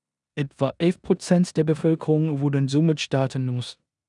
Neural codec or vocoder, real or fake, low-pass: codec, 16 kHz in and 24 kHz out, 0.9 kbps, LongCat-Audio-Codec, four codebook decoder; fake; 10.8 kHz